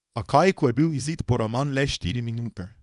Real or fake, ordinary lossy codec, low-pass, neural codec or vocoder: fake; none; 10.8 kHz; codec, 24 kHz, 0.9 kbps, WavTokenizer, small release